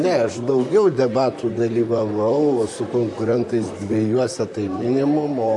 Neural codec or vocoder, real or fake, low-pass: vocoder, 44.1 kHz, 128 mel bands, Pupu-Vocoder; fake; 10.8 kHz